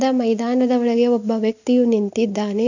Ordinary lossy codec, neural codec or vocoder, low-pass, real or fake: none; none; 7.2 kHz; real